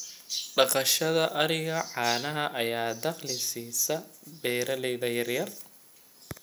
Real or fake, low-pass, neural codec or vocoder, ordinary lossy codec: real; none; none; none